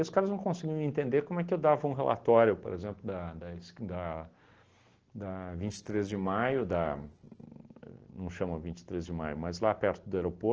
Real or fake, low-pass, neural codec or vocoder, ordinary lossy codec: real; 7.2 kHz; none; Opus, 16 kbps